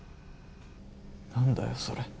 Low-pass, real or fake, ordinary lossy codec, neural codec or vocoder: none; real; none; none